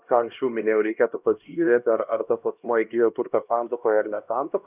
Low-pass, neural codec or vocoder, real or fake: 3.6 kHz; codec, 16 kHz, 1 kbps, X-Codec, HuBERT features, trained on LibriSpeech; fake